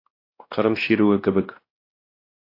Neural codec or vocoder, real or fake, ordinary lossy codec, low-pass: codec, 16 kHz, 1 kbps, X-Codec, WavLM features, trained on Multilingual LibriSpeech; fake; AAC, 32 kbps; 5.4 kHz